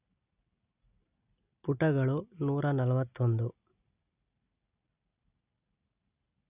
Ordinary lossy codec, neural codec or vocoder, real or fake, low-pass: none; none; real; 3.6 kHz